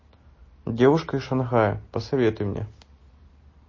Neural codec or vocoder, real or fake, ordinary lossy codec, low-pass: none; real; MP3, 32 kbps; 7.2 kHz